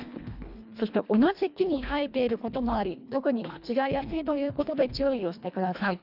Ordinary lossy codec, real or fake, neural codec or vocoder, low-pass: none; fake; codec, 24 kHz, 1.5 kbps, HILCodec; 5.4 kHz